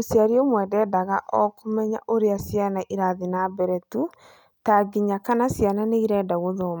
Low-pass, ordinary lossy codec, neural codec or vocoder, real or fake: none; none; none; real